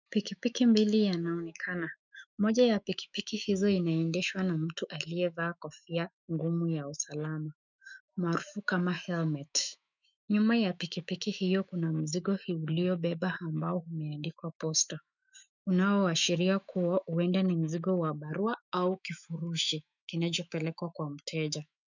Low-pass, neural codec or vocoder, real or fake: 7.2 kHz; autoencoder, 48 kHz, 128 numbers a frame, DAC-VAE, trained on Japanese speech; fake